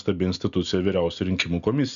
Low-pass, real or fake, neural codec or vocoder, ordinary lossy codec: 7.2 kHz; real; none; MP3, 96 kbps